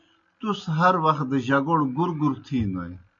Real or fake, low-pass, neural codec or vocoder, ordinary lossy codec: real; 7.2 kHz; none; MP3, 48 kbps